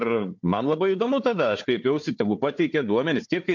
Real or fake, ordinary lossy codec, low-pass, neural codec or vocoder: fake; MP3, 48 kbps; 7.2 kHz; codec, 16 kHz, 4 kbps, FunCodec, trained on Chinese and English, 50 frames a second